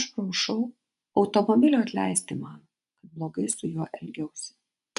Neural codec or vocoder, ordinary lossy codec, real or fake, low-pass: vocoder, 24 kHz, 100 mel bands, Vocos; MP3, 96 kbps; fake; 10.8 kHz